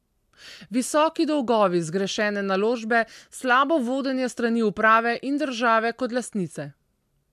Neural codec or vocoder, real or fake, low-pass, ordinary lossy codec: none; real; 14.4 kHz; MP3, 96 kbps